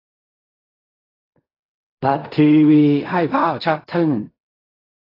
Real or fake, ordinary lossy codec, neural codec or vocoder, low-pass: fake; AAC, 24 kbps; codec, 16 kHz in and 24 kHz out, 0.4 kbps, LongCat-Audio-Codec, fine tuned four codebook decoder; 5.4 kHz